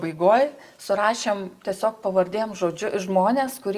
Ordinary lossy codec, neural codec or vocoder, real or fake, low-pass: Opus, 32 kbps; none; real; 14.4 kHz